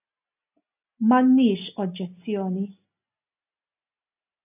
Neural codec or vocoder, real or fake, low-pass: none; real; 3.6 kHz